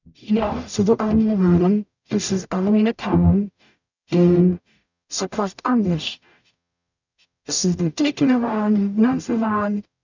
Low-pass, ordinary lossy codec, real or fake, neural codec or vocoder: 7.2 kHz; none; fake; codec, 44.1 kHz, 0.9 kbps, DAC